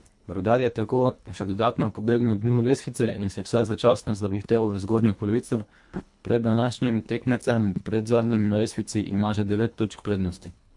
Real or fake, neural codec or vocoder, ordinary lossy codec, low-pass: fake; codec, 24 kHz, 1.5 kbps, HILCodec; MP3, 64 kbps; 10.8 kHz